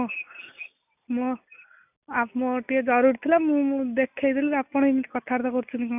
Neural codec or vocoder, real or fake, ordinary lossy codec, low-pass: none; real; none; 3.6 kHz